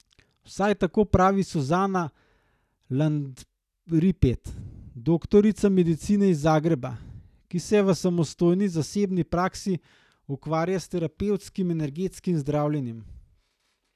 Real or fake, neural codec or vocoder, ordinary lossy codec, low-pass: real; none; none; 14.4 kHz